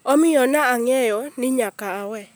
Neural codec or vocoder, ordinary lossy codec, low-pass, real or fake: none; none; none; real